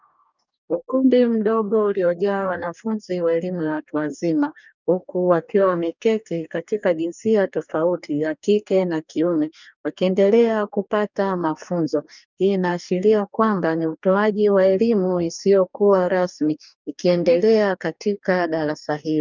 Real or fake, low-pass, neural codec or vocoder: fake; 7.2 kHz; codec, 44.1 kHz, 2.6 kbps, DAC